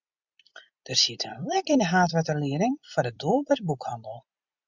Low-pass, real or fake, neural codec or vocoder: 7.2 kHz; fake; vocoder, 44.1 kHz, 128 mel bands every 256 samples, BigVGAN v2